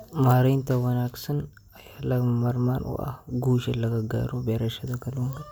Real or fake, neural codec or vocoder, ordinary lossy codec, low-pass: real; none; none; none